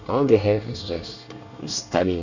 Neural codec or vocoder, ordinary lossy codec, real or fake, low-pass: codec, 24 kHz, 1 kbps, SNAC; none; fake; 7.2 kHz